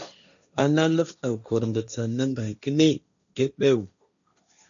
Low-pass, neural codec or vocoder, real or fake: 7.2 kHz; codec, 16 kHz, 1.1 kbps, Voila-Tokenizer; fake